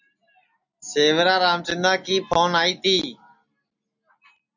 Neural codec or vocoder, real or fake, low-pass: none; real; 7.2 kHz